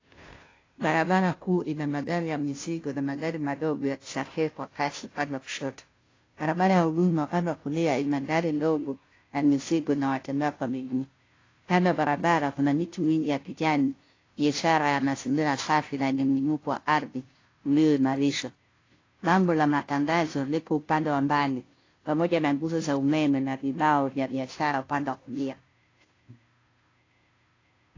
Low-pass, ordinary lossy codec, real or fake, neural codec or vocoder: 7.2 kHz; AAC, 32 kbps; fake; codec, 16 kHz, 0.5 kbps, FunCodec, trained on Chinese and English, 25 frames a second